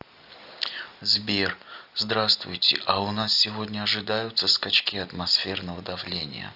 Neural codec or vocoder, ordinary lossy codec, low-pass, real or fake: none; AAC, 48 kbps; 5.4 kHz; real